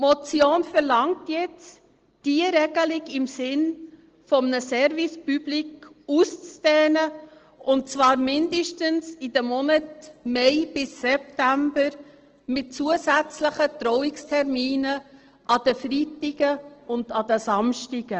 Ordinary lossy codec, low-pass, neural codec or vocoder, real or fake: Opus, 16 kbps; 7.2 kHz; none; real